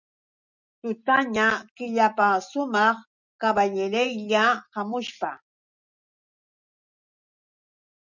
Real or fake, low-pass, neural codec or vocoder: real; 7.2 kHz; none